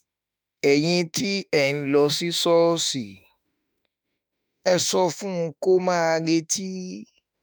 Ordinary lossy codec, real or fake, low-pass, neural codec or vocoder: none; fake; none; autoencoder, 48 kHz, 32 numbers a frame, DAC-VAE, trained on Japanese speech